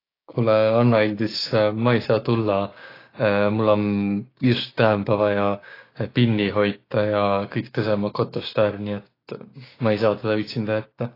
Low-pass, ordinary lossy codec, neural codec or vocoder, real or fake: 5.4 kHz; AAC, 24 kbps; codec, 16 kHz, 6 kbps, DAC; fake